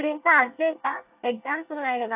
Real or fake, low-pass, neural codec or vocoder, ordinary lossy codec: fake; 3.6 kHz; codec, 24 kHz, 1 kbps, SNAC; AAC, 32 kbps